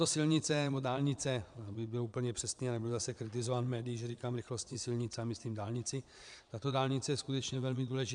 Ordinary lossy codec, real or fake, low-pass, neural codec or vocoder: MP3, 96 kbps; fake; 9.9 kHz; vocoder, 22.05 kHz, 80 mel bands, Vocos